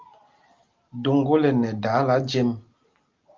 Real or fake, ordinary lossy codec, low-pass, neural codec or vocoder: real; Opus, 32 kbps; 7.2 kHz; none